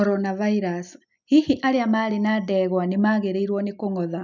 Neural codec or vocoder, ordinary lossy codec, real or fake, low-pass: none; none; real; 7.2 kHz